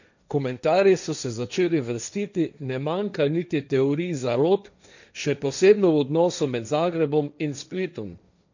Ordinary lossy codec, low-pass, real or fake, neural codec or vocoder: none; 7.2 kHz; fake; codec, 16 kHz, 1.1 kbps, Voila-Tokenizer